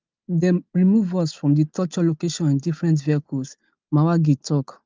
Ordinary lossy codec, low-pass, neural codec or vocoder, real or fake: Opus, 24 kbps; 7.2 kHz; none; real